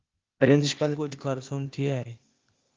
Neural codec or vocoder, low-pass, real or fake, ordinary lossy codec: codec, 16 kHz, 0.8 kbps, ZipCodec; 7.2 kHz; fake; Opus, 32 kbps